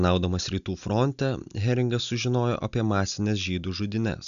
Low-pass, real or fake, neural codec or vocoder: 7.2 kHz; real; none